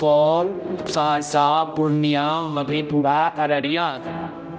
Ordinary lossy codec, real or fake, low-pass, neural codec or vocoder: none; fake; none; codec, 16 kHz, 0.5 kbps, X-Codec, HuBERT features, trained on general audio